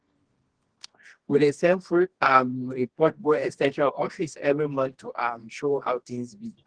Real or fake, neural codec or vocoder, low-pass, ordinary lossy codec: fake; codec, 24 kHz, 0.9 kbps, WavTokenizer, medium music audio release; 9.9 kHz; Opus, 16 kbps